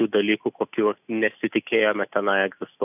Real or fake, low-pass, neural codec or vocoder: real; 3.6 kHz; none